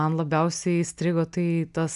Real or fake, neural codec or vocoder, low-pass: real; none; 10.8 kHz